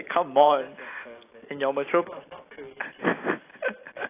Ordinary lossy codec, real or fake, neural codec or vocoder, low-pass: AAC, 24 kbps; real; none; 3.6 kHz